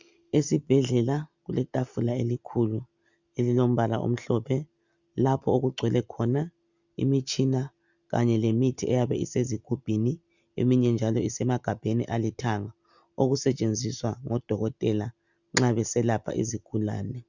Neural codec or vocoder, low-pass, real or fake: none; 7.2 kHz; real